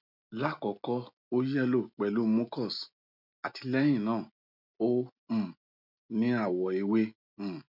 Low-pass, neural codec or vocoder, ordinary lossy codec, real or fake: 5.4 kHz; none; none; real